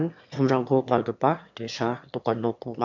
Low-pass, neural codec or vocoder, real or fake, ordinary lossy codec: 7.2 kHz; autoencoder, 22.05 kHz, a latent of 192 numbers a frame, VITS, trained on one speaker; fake; MP3, 64 kbps